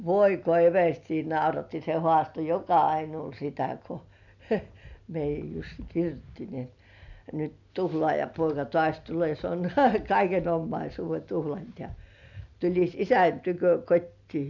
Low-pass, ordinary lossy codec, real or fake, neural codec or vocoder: 7.2 kHz; none; real; none